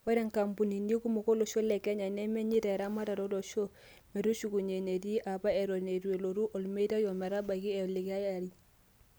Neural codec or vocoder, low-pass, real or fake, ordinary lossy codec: none; none; real; none